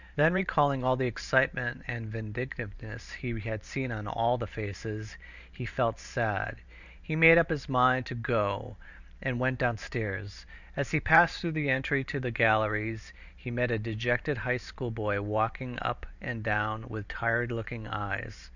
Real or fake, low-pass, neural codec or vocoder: fake; 7.2 kHz; vocoder, 44.1 kHz, 128 mel bands every 512 samples, BigVGAN v2